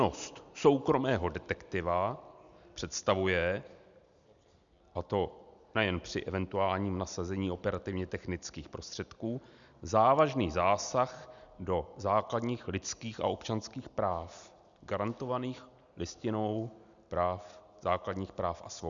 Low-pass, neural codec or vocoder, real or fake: 7.2 kHz; none; real